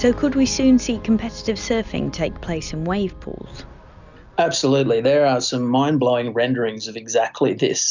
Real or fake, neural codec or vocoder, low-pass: real; none; 7.2 kHz